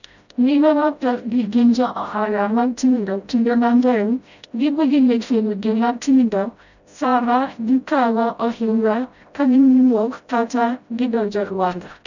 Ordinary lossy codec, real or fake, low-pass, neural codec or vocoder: none; fake; 7.2 kHz; codec, 16 kHz, 0.5 kbps, FreqCodec, smaller model